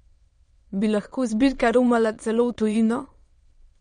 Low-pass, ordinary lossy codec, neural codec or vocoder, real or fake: 9.9 kHz; MP3, 48 kbps; autoencoder, 22.05 kHz, a latent of 192 numbers a frame, VITS, trained on many speakers; fake